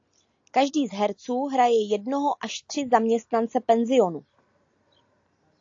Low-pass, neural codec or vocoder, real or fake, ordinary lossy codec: 7.2 kHz; none; real; AAC, 48 kbps